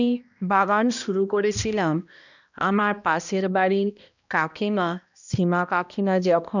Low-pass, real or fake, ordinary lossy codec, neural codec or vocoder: 7.2 kHz; fake; none; codec, 16 kHz, 1 kbps, X-Codec, HuBERT features, trained on balanced general audio